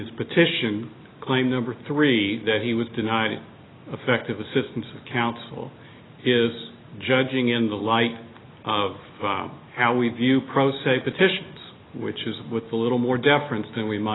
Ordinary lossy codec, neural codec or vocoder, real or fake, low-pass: AAC, 16 kbps; none; real; 7.2 kHz